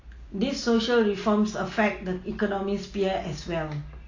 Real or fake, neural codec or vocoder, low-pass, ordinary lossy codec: real; none; 7.2 kHz; AAC, 32 kbps